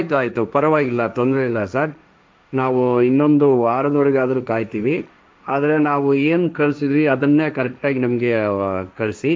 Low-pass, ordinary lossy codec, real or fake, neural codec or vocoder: none; none; fake; codec, 16 kHz, 1.1 kbps, Voila-Tokenizer